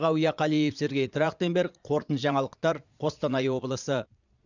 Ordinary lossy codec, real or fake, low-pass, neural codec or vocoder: none; fake; 7.2 kHz; vocoder, 22.05 kHz, 80 mel bands, Vocos